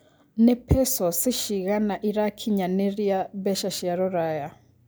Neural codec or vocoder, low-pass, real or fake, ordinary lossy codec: none; none; real; none